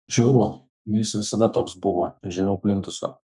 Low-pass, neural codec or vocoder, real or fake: 10.8 kHz; codec, 32 kHz, 1.9 kbps, SNAC; fake